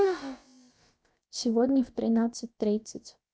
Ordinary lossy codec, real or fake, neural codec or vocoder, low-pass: none; fake; codec, 16 kHz, about 1 kbps, DyCAST, with the encoder's durations; none